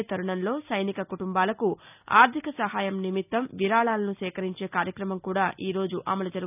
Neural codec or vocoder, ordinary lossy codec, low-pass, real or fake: none; none; 3.6 kHz; real